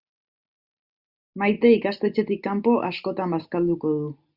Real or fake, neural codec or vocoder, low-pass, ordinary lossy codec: real; none; 5.4 kHz; Opus, 64 kbps